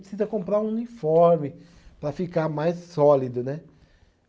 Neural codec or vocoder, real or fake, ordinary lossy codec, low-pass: none; real; none; none